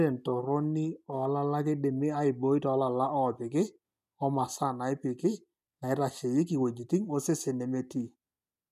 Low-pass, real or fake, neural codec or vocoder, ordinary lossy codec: 14.4 kHz; real; none; none